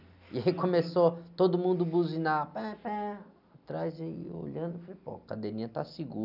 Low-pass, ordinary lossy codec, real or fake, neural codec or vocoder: 5.4 kHz; none; real; none